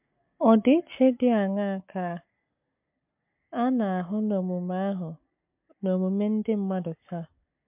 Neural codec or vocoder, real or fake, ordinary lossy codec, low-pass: codec, 44.1 kHz, 7.8 kbps, Pupu-Codec; fake; MP3, 32 kbps; 3.6 kHz